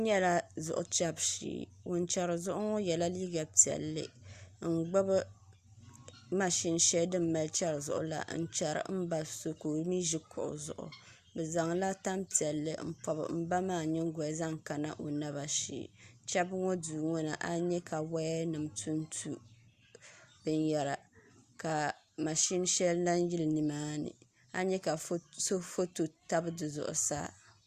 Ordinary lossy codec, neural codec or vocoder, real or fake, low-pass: Opus, 64 kbps; none; real; 14.4 kHz